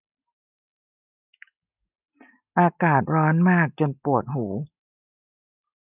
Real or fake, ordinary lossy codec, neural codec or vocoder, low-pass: real; none; none; 3.6 kHz